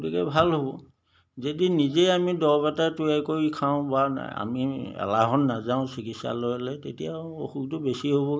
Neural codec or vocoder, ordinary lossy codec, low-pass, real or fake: none; none; none; real